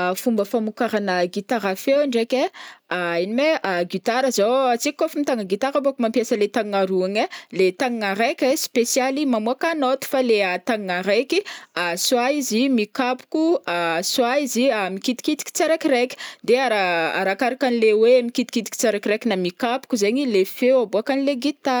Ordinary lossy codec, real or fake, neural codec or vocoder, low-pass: none; real; none; none